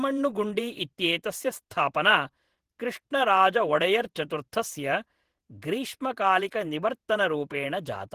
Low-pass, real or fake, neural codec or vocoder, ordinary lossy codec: 14.4 kHz; fake; vocoder, 48 kHz, 128 mel bands, Vocos; Opus, 16 kbps